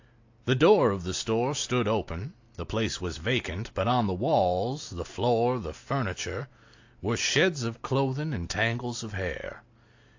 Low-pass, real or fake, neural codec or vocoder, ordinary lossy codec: 7.2 kHz; real; none; AAC, 48 kbps